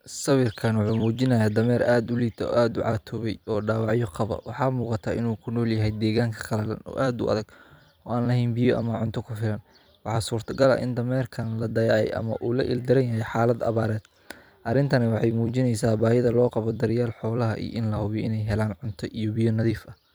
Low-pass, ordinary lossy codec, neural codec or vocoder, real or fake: none; none; vocoder, 44.1 kHz, 128 mel bands every 256 samples, BigVGAN v2; fake